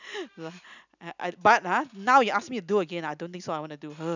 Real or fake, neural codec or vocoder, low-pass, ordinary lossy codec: real; none; 7.2 kHz; none